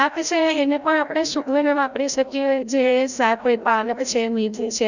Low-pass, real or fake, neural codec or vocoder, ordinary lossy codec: 7.2 kHz; fake; codec, 16 kHz, 0.5 kbps, FreqCodec, larger model; none